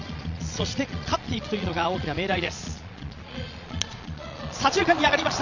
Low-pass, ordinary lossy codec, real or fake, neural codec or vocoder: 7.2 kHz; none; fake; vocoder, 22.05 kHz, 80 mel bands, Vocos